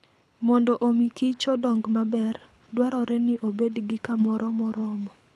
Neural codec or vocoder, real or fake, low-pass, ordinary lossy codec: codec, 24 kHz, 6 kbps, HILCodec; fake; none; none